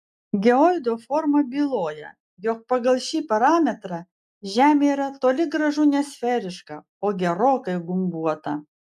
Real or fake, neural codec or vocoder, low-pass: real; none; 14.4 kHz